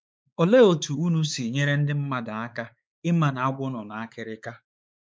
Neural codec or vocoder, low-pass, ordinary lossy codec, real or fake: codec, 16 kHz, 4 kbps, X-Codec, WavLM features, trained on Multilingual LibriSpeech; none; none; fake